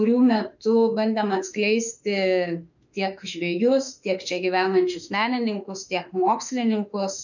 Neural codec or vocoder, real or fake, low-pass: autoencoder, 48 kHz, 32 numbers a frame, DAC-VAE, trained on Japanese speech; fake; 7.2 kHz